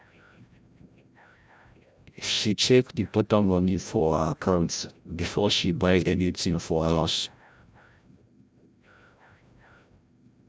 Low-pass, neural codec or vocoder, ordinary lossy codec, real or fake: none; codec, 16 kHz, 0.5 kbps, FreqCodec, larger model; none; fake